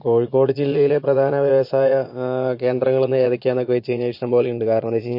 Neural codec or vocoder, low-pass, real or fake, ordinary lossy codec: vocoder, 22.05 kHz, 80 mel bands, Vocos; 5.4 kHz; fake; MP3, 32 kbps